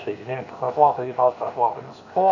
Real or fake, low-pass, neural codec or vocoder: fake; 7.2 kHz; codec, 16 kHz, 0.7 kbps, FocalCodec